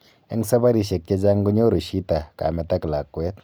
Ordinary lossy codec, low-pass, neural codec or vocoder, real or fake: none; none; none; real